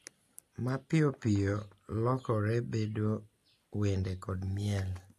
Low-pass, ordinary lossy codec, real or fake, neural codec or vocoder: 14.4 kHz; AAC, 64 kbps; real; none